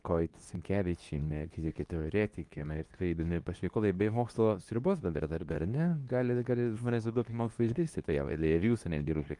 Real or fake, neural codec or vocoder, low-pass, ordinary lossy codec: fake; codec, 24 kHz, 0.9 kbps, WavTokenizer, medium speech release version 2; 10.8 kHz; Opus, 32 kbps